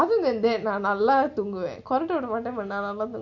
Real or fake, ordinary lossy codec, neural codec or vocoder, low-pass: real; MP3, 48 kbps; none; 7.2 kHz